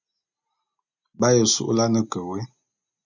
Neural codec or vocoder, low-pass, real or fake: none; 7.2 kHz; real